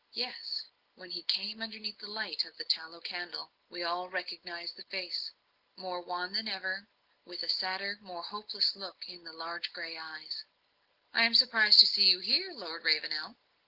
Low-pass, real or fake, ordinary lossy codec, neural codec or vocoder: 5.4 kHz; real; Opus, 16 kbps; none